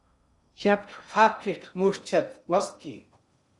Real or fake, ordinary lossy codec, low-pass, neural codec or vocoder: fake; Opus, 64 kbps; 10.8 kHz; codec, 16 kHz in and 24 kHz out, 0.6 kbps, FocalCodec, streaming, 2048 codes